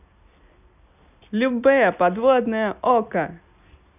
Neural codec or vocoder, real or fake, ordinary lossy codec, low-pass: none; real; none; 3.6 kHz